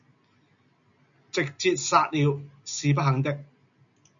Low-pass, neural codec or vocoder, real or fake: 7.2 kHz; none; real